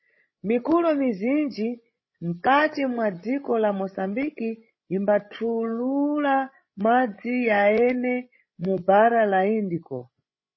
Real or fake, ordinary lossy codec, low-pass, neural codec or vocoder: fake; MP3, 24 kbps; 7.2 kHz; codec, 16 kHz, 16 kbps, FreqCodec, larger model